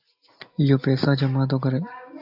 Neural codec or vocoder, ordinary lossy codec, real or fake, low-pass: none; AAC, 48 kbps; real; 5.4 kHz